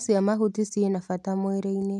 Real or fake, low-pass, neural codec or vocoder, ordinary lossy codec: real; none; none; none